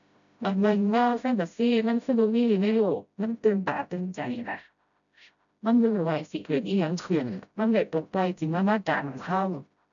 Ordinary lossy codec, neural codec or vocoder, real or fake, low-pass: none; codec, 16 kHz, 0.5 kbps, FreqCodec, smaller model; fake; 7.2 kHz